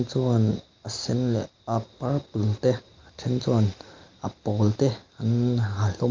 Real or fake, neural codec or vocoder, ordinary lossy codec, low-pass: real; none; Opus, 24 kbps; 7.2 kHz